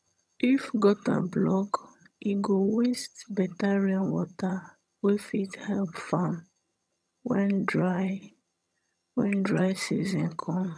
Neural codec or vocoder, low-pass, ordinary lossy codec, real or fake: vocoder, 22.05 kHz, 80 mel bands, HiFi-GAN; none; none; fake